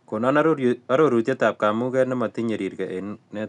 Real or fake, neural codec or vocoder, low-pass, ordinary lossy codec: real; none; 10.8 kHz; none